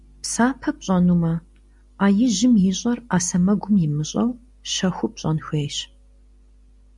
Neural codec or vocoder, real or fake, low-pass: none; real; 10.8 kHz